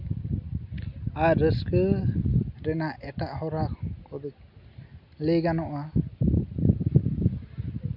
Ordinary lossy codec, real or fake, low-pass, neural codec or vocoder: MP3, 48 kbps; real; 5.4 kHz; none